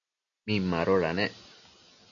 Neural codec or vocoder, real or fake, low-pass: none; real; 7.2 kHz